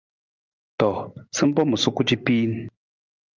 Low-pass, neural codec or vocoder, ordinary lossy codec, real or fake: 7.2 kHz; none; Opus, 24 kbps; real